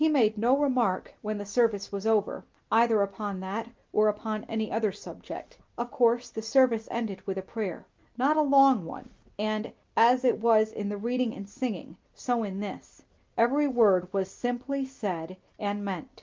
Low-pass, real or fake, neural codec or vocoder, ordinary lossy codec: 7.2 kHz; real; none; Opus, 24 kbps